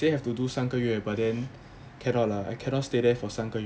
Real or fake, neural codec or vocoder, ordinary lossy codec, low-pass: real; none; none; none